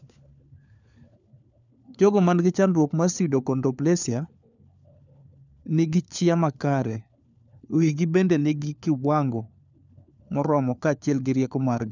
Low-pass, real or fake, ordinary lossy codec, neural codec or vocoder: 7.2 kHz; fake; none; codec, 16 kHz, 4 kbps, FunCodec, trained on LibriTTS, 50 frames a second